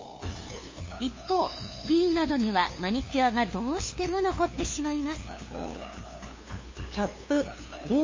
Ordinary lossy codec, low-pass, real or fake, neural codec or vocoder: MP3, 32 kbps; 7.2 kHz; fake; codec, 16 kHz, 2 kbps, FunCodec, trained on LibriTTS, 25 frames a second